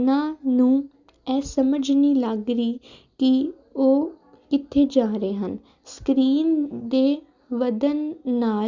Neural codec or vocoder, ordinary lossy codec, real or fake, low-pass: none; Opus, 64 kbps; real; 7.2 kHz